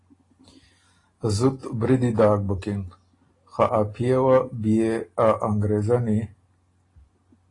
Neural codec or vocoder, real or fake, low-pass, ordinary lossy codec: none; real; 10.8 kHz; AAC, 32 kbps